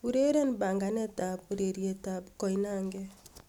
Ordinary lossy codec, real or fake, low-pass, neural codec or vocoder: none; real; 19.8 kHz; none